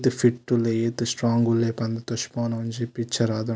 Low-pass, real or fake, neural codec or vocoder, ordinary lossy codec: none; real; none; none